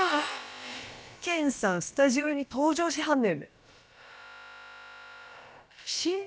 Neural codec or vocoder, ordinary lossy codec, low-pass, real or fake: codec, 16 kHz, about 1 kbps, DyCAST, with the encoder's durations; none; none; fake